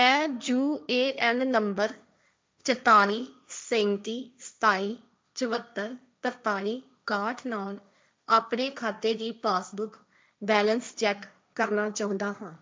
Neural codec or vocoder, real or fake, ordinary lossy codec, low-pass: codec, 16 kHz, 1.1 kbps, Voila-Tokenizer; fake; none; none